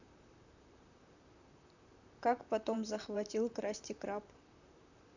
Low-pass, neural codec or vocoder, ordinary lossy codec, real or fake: 7.2 kHz; vocoder, 44.1 kHz, 128 mel bands, Pupu-Vocoder; none; fake